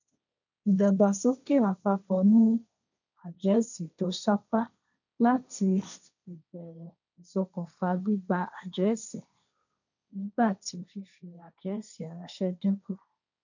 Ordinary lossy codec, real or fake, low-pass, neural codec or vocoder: none; fake; 7.2 kHz; codec, 16 kHz, 1.1 kbps, Voila-Tokenizer